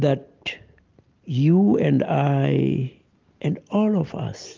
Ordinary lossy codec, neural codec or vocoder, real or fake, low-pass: Opus, 32 kbps; none; real; 7.2 kHz